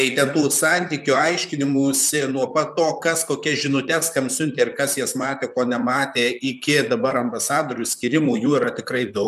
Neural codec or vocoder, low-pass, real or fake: vocoder, 44.1 kHz, 128 mel bands, Pupu-Vocoder; 14.4 kHz; fake